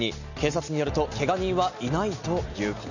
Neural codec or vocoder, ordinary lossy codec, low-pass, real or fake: none; none; 7.2 kHz; real